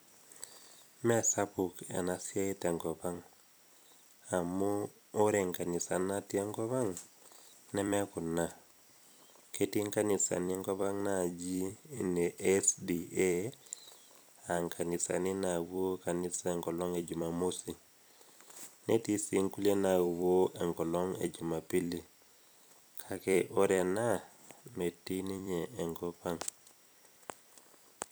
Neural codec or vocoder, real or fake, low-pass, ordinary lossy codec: vocoder, 44.1 kHz, 128 mel bands every 256 samples, BigVGAN v2; fake; none; none